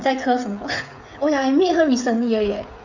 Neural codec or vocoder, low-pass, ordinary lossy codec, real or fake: codec, 16 kHz, 8 kbps, FreqCodec, smaller model; 7.2 kHz; none; fake